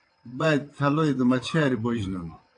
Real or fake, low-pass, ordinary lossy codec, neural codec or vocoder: fake; 9.9 kHz; AAC, 48 kbps; vocoder, 22.05 kHz, 80 mel bands, WaveNeXt